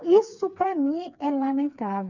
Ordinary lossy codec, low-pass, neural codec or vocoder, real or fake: none; 7.2 kHz; codec, 16 kHz, 4 kbps, FreqCodec, smaller model; fake